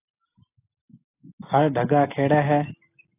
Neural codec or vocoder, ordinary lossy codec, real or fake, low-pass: none; AAC, 24 kbps; real; 3.6 kHz